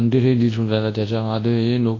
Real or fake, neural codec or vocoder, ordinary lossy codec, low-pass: fake; codec, 24 kHz, 0.9 kbps, WavTokenizer, large speech release; AAC, 32 kbps; 7.2 kHz